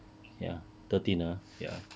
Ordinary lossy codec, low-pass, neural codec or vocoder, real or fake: none; none; none; real